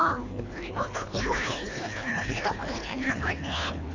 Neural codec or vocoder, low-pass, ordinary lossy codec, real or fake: codec, 24 kHz, 1.5 kbps, HILCodec; 7.2 kHz; AAC, 32 kbps; fake